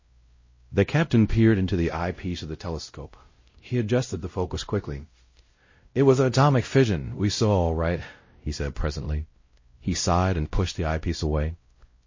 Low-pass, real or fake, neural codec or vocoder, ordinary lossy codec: 7.2 kHz; fake; codec, 16 kHz, 0.5 kbps, X-Codec, WavLM features, trained on Multilingual LibriSpeech; MP3, 32 kbps